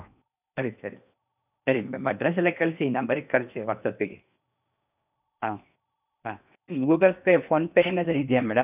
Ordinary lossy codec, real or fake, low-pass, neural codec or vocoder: none; fake; 3.6 kHz; codec, 16 kHz in and 24 kHz out, 0.8 kbps, FocalCodec, streaming, 65536 codes